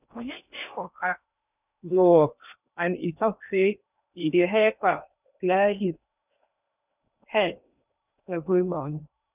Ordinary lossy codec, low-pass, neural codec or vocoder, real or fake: none; 3.6 kHz; codec, 16 kHz in and 24 kHz out, 0.8 kbps, FocalCodec, streaming, 65536 codes; fake